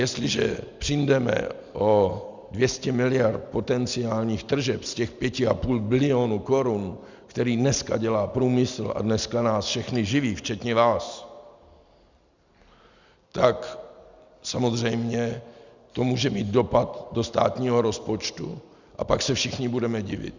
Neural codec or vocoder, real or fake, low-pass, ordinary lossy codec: none; real; 7.2 kHz; Opus, 64 kbps